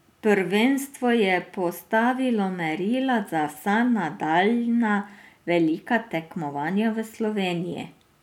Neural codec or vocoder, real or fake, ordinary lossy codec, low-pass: none; real; none; 19.8 kHz